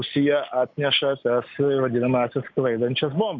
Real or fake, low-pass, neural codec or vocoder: real; 7.2 kHz; none